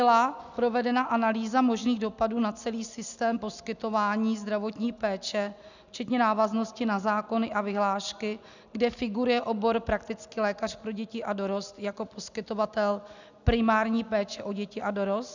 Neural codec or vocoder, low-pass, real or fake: none; 7.2 kHz; real